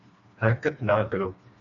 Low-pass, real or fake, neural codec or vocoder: 7.2 kHz; fake; codec, 16 kHz, 2 kbps, FreqCodec, smaller model